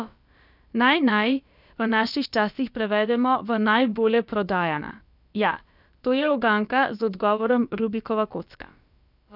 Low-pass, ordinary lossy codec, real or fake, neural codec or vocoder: 5.4 kHz; none; fake; codec, 16 kHz, about 1 kbps, DyCAST, with the encoder's durations